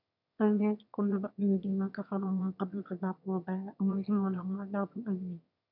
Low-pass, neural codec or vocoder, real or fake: 5.4 kHz; autoencoder, 22.05 kHz, a latent of 192 numbers a frame, VITS, trained on one speaker; fake